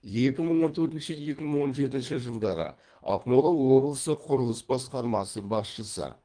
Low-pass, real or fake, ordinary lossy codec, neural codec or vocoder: 9.9 kHz; fake; Opus, 32 kbps; codec, 24 kHz, 1.5 kbps, HILCodec